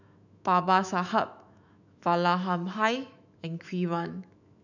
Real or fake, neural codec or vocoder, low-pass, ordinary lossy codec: fake; autoencoder, 48 kHz, 128 numbers a frame, DAC-VAE, trained on Japanese speech; 7.2 kHz; none